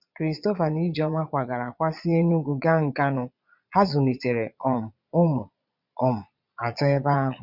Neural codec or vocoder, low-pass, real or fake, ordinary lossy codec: vocoder, 22.05 kHz, 80 mel bands, Vocos; 5.4 kHz; fake; none